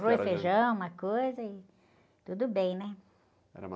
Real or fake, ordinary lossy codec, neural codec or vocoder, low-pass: real; none; none; none